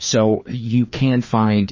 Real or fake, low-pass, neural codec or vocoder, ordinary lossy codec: fake; 7.2 kHz; codec, 16 kHz, 2 kbps, FreqCodec, larger model; MP3, 32 kbps